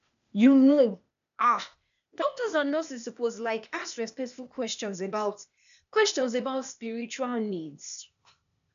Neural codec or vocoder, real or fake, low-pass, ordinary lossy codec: codec, 16 kHz, 0.8 kbps, ZipCodec; fake; 7.2 kHz; none